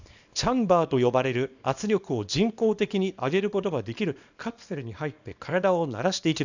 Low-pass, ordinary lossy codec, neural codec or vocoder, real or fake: 7.2 kHz; none; codec, 24 kHz, 0.9 kbps, WavTokenizer, small release; fake